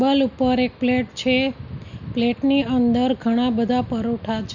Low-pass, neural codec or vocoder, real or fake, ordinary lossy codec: 7.2 kHz; none; real; none